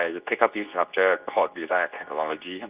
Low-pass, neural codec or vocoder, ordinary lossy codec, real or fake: 3.6 kHz; codec, 24 kHz, 0.9 kbps, WavTokenizer, medium speech release version 2; Opus, 32 kbps; fake